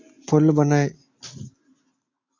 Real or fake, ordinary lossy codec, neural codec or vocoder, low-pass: real; AAC, 48 kbps; none; 7.2 kHz